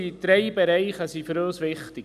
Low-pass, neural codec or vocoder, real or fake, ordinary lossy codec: 14.4 kHz; none; real; none